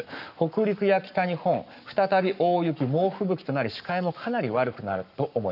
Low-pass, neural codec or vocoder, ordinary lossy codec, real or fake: 5.4 kHz; codec, 44.1 kHz, 7.8 kbps, Pupu-Codec; none; fake